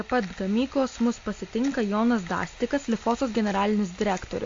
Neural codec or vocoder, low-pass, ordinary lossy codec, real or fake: none; 7.2 kHz; AAC, 48 kbps; real